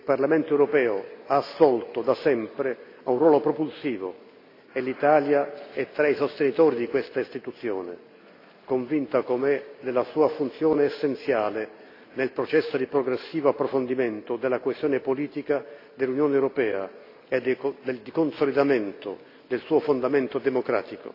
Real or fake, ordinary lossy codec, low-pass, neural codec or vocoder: real; AAC, 32 kbps; 5.4 kHz; none